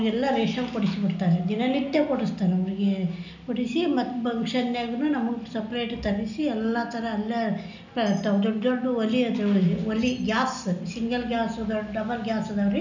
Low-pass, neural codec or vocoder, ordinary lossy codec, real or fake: 7.2 kHz; none; none; real